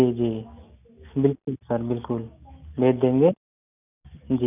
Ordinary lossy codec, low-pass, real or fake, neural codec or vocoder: none; 3.6 kHz; real; none